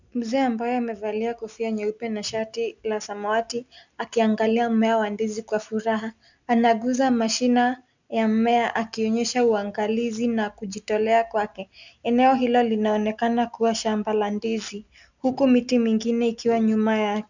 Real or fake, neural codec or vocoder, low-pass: real; none; 7.2 kHz